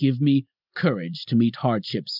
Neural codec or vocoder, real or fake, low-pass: none; real; 5.4 kHz